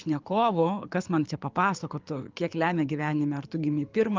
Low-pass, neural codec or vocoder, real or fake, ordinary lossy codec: 7.2 kHz; codec, 24 kHz, 6 kbps, HILCodec; fake; Opus, 24 kbps